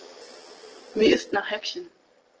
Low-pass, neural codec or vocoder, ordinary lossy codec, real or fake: 7.2 kHz; vocoder, 22.05 kHz, 80 mel bands, Vocos; Opus, 16 kbps; fake